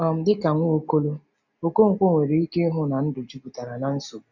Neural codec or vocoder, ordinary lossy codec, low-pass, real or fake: none; none; 7.2 kHz; real